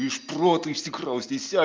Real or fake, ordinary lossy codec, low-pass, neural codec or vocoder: real; Opus, 24 kbps; 7.2 kHz; none